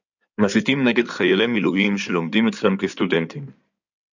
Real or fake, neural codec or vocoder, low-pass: fake; codec, 16 kHz in and 24 kHz out, 2.2 kbps, FireRedTTS-2 codec; 7.2 kHz